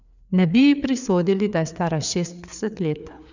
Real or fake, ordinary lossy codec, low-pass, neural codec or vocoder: fake; none; 7.2 kHz; codec, 16 kHz, 4 kbps, FreqCodec, larger model